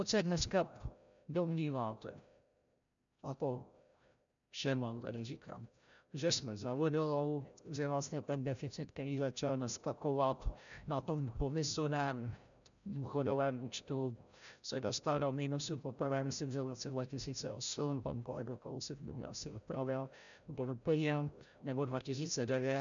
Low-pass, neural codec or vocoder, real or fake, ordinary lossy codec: 7.2 kHz; codec, 16 kHz, 0.5 kbps, FreqCodec, larger model; fake; MP3, 96 kbps